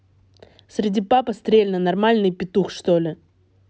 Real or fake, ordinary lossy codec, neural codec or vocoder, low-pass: real; none; none; none